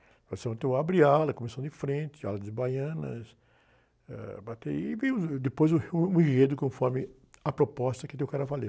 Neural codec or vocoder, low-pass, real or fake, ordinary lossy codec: none; none; real; none